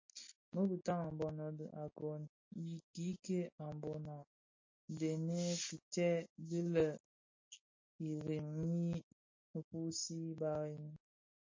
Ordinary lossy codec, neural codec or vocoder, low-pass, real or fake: MP3, 32 kbps; none; 7.2 kHz; real